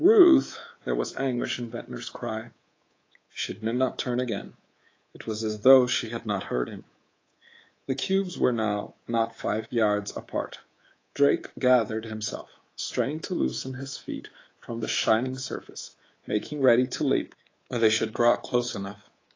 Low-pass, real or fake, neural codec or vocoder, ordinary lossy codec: 7.2 kHz; fake; codec, 24 kHz, 3.1 kbps, DualCodec; AAC, 32 kbps